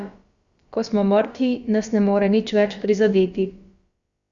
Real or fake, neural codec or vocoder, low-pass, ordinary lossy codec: fake; codec, 16 kHz, about 1 kbps, DyCAST, with the encoder's durations; 7.2 kHz; Opus, 64 kbps